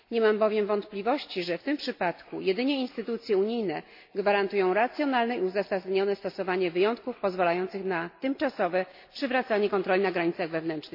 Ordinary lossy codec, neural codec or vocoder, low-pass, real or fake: MP3, 32 kbps; none; 5.4 kHz; real